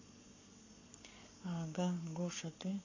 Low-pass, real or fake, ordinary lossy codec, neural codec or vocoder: 7.2 kHz; fake; AAC, 48 kbps; codec, 44.1 kHz, 7.8 kbps, Pupu-Codec